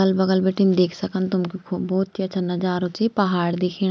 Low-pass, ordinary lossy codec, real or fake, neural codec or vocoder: none; none; real; none